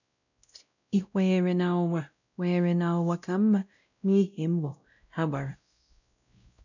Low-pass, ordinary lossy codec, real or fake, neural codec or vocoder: 7.2 kHz; none; fake; codec, 16 kHz, 0.5 kbps, X-Codec, WavLM features, trained on Multilingual LibriSpeech